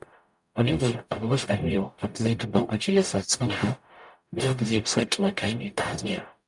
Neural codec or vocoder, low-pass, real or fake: codec, 44.1 kHz, 0.9 kbps, DAC; 10.8 kHz; fake